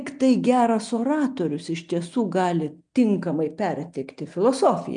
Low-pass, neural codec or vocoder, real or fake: 9.9 kHz; none; real